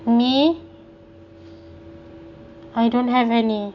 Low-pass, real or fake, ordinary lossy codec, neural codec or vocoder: 7.2 kHz; real; none; none